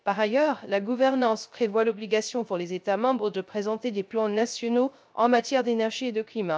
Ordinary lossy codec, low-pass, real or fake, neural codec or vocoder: none; none; fake; codec, 16 kHz, 0.3 kbps, FocalCodec